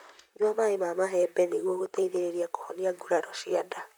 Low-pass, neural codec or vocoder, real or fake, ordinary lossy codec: none; vocoder, 44.1 kHz, 128 mel bands, Pupu-Vocoder; fake; none